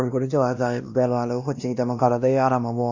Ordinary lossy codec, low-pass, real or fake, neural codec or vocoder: Opus, 64 kbps; 7.2 kHz; fake; codec, 16 kHz, 1 kbps, X-Codec, WavLM features, trained on Multilingual LibriSpeech